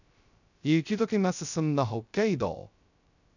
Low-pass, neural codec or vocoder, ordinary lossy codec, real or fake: 7.2 kHz; codec, 16 kHz, 0.2 kbps, FocalCodec; none; fake